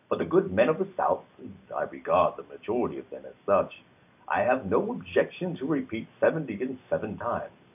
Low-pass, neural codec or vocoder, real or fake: 3.6 kHz; none; real